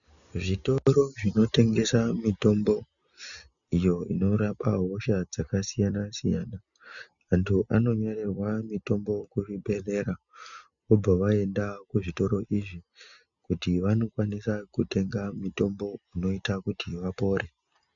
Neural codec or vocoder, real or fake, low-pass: none; real; 7.2 kHz